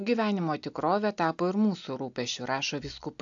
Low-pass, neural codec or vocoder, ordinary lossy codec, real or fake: 7.2 kHz; none; AAC, 64 kbps; real